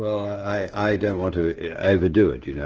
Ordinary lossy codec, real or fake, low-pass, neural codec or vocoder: Opus, 16 kbps; fake; 7.2 kHz; codec, 16 kHz, 16 kbps, FreqCodec, smaller model